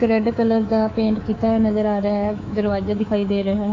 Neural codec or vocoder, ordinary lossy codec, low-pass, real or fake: codec, 24 kHz, 3.1 kbps, DualCodec; AAC, 32 kbps; 7.2 kHz; fake